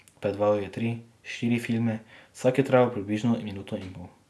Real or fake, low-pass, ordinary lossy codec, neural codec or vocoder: real; none; none; none